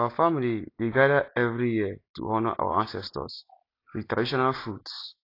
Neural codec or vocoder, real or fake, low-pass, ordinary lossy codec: none; real; 5.4 kHz; AAC, 32 kbps